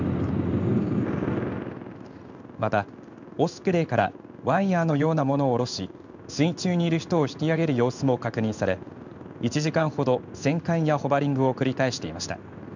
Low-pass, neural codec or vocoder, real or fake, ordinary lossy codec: 7.2 kHz; codec, 16 kHz in and 24 kHz out, 1 kbps, XY-Tokenizer; fake; none